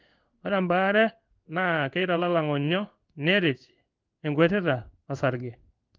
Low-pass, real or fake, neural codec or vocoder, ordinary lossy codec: 7.2 kHz; fake; codec, 16 kHz in and 24 kHz out, 1 kbps, XY-Tokenizer; Opus, 24 kbps